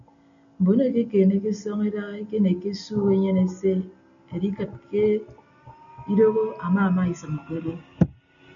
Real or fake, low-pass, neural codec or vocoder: real; 7.2 kHz; none